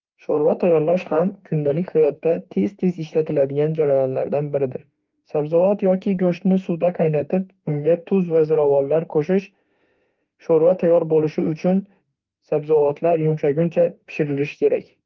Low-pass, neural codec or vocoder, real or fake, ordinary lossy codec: 7.2 kHz; autoencoder, 48 kHz, 32 numbers a frame, DAC-VAE, trained on Japanese speech; fake; Opus, 32 kbps